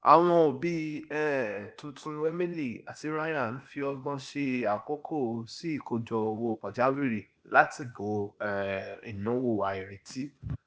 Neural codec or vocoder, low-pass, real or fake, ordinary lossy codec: codec, 16 kHz, 0.8 kbps, ZipCodec; none; fake; none